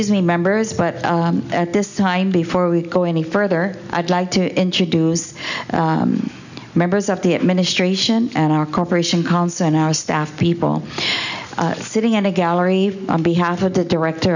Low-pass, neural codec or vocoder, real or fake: 7.2 kHz; none; real